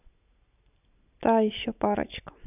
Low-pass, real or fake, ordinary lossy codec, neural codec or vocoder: 3.6 kHz; real; none; none